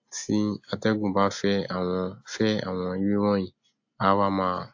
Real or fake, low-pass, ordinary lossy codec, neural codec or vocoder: real; 7.2 kHz; AAC, 48 kbps; none